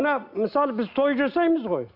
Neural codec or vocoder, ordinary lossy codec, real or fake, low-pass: none; none; real; 5.4 kHz